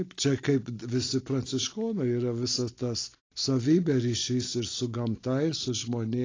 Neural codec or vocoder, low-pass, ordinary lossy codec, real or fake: none; 7.2 kHz; AAC, 32 kbps; real